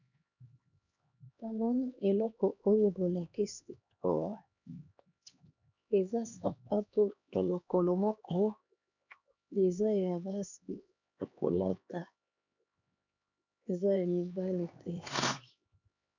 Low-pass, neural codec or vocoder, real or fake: 7.2 kHz; codec, 16 kHz, 2 kbps, X-Codec, HuBERT features, trained on LibriSpeech; fake